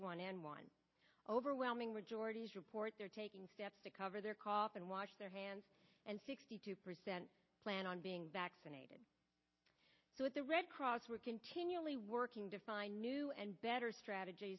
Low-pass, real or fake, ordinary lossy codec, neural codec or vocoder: 7.2 kHz; real; MP3, 24 kbps; none